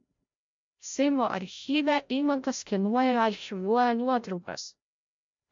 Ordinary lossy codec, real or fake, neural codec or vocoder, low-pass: MP3, 48 kbps; fake; codec, 16 kHz, 0.5 kbps, FreqCodec, larger model; 7.2 kHz